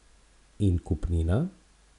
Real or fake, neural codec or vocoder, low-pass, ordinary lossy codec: real; none; 10.8 kHz; none